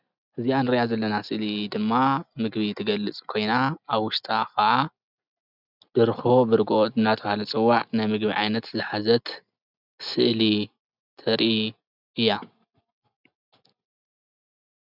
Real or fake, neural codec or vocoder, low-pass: real; none; 5.4 kHz